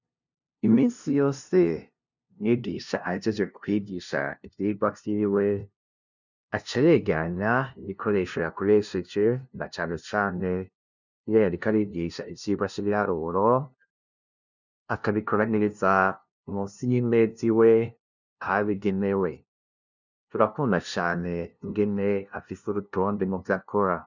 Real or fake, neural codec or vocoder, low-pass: fake; codec, 16 kHz, 0.5 kbps, FunCodec, trained on LibriTTS, 25 frames a second; 7.2 kHz